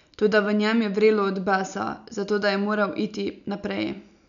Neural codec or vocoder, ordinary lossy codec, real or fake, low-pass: none; none; real; 7.2 kHz